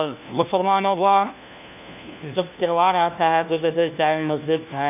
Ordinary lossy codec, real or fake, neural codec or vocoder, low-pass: none; fake; codec, 16 kHz, 1 kbps, FunCodec, trained on LibriTTS, 50 frames a second; 3.6 kHz